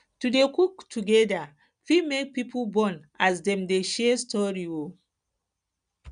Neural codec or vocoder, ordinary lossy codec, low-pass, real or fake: none; none; 9.9 kHz; real